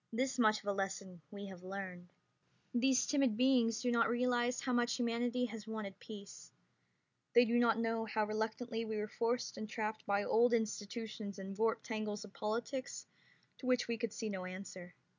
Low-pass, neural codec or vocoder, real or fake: 7.2 kHz; none; real